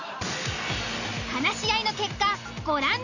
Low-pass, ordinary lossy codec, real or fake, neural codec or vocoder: 7.2 kHz; none; real; none